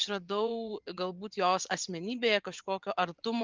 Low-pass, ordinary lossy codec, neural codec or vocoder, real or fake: 7.2 kHz; Opus, 32 kbps; vocoder, 24 kHz, 100 mel bands, Vocos; fake